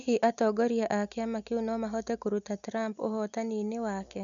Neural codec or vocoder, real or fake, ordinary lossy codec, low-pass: none; real; none; 7.2 kHz